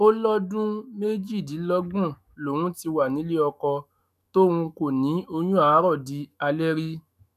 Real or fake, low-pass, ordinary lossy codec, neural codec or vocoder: fake; 14.4 kHz; none; autoencoder, 48 kHz, 128 numbers a frame, DAC-VAE, trained on Japanese speech